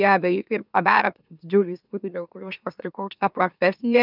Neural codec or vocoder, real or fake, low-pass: autoencoder, 44.1 kHz, a latent of 192 numbers a frame, MeloTTS; fake; 5.4 kHz